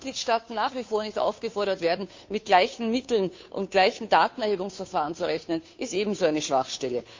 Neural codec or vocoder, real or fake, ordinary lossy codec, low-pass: codec, 16 kHz, 2 kbps, FunCodec, trained on Chinese and English, 25 frames a second; fake; MP3, 64 kbps; 7.2 kHz